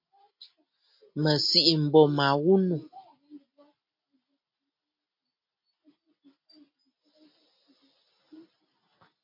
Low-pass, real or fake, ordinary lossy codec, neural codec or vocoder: 5.4 kHz; real; MP3, 32 kbps; none